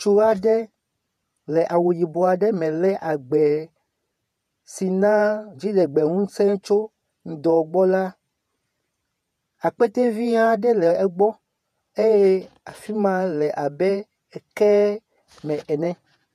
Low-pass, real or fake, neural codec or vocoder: 14.4 kHz; fake; vocoder, 48 kHz, 128 mel bands, Vocos